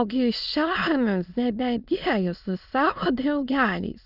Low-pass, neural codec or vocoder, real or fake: 5.4 kHz; autoencoder, 22.05 kHz, a latent of 192 numbers a frame, VITS, trained on many speakers; fake